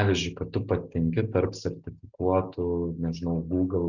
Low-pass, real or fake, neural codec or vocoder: 7.2 kHz; real; none